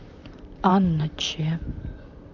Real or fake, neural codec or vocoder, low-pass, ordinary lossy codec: fake; vocoder, 44.1 kHz, 128 mel bands, Pupu-Vocoder; 7.2 kHz; none